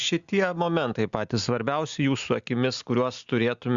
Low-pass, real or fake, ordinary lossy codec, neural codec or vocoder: 7.2 kHz; real; Opus, 64 kbps; none